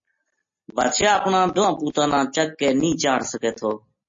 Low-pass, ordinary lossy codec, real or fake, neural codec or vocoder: 7.2 kHz; MP3, 32 kbps; real; none